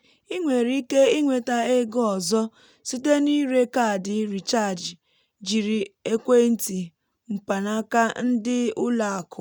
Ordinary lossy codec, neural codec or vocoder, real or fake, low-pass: none; none; real; 19.8 kHz